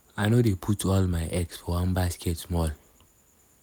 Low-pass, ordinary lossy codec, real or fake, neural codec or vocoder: none; none; real; none